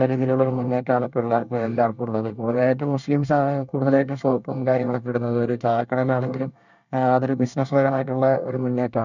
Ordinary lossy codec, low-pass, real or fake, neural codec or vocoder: none; 7.2 kHz; fake; codec, 24 kHz, 1 kbps, SNAC